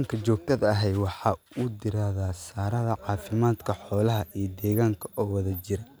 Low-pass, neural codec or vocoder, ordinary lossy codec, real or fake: none; none; none; real